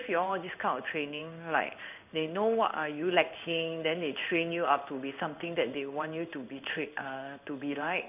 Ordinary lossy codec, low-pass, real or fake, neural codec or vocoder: none; 3.6 kHz; fake; codec, 16 kHz in and 24 kHz out, 1 kbps, XY-Tokenizer